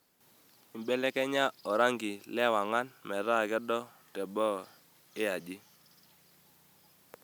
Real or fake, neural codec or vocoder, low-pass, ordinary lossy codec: real; none; none; none